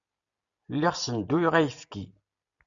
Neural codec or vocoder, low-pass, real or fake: none; 7.2 kHz; real